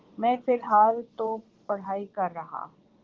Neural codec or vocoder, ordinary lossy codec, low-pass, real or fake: vocoder, 22.05 kHz, 80 mel bands, Vocos; Opus, 16 kbps; 7.2 kHz; fake